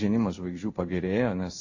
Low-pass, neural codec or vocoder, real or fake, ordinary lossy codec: 7.2 kHz; codec, 16 kHz in and 24 kHz out, 1 kbps, XY-Tokenizer; fake; AAC, 48 kbps